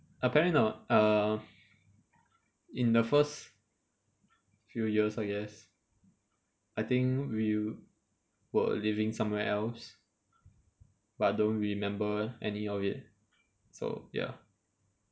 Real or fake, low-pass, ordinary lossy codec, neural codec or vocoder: real; none; none; none